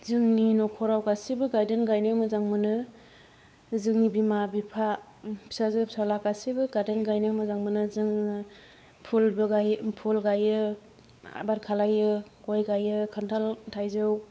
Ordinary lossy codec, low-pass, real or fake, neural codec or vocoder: none; none; fake; codec, 16 kHz, 4 kbps, X-Codec, WavLM features, trained on Multilingual LibriSpeech